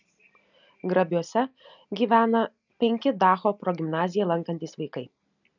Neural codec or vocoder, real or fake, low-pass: none; real; 7.2 kHz